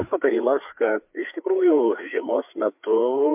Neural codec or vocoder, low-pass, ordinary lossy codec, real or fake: codec, 16 kHz, 4 kbps, FreqCodec, larger model; 3.6 kHz; MP3, 32 kbps; fake